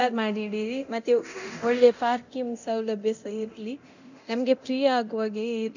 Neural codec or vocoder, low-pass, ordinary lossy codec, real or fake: codec, 24 kHz, 0.9 kbps, DualCodec; 7.2 kHz; none; fake